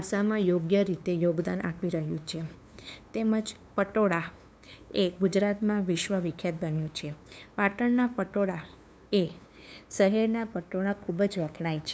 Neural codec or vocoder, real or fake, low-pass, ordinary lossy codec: codec, 16 kHz, 2 kbps, FunCodec, trained on LibriTTS, 25 frames a second; fake; none; none